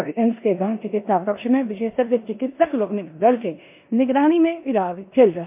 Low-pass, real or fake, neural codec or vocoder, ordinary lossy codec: 3.6 kHz; fake; codec, 16 kHz in and 24 kHz out, 0.9 kbps, LongCat-Audio-Codec, four codebook decoder; MP3, 32 kbps